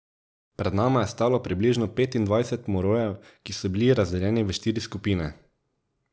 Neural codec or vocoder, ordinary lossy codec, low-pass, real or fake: none; none; none; real